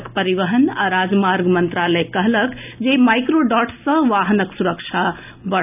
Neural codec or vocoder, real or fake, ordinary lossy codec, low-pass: none; real; none; 3.6 kHz